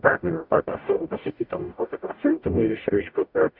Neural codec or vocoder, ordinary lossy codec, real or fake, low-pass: codec, 44.1 kHz, 0.9 kbps, DAC; Opus, 64 kbps; fake; 5.4 kHz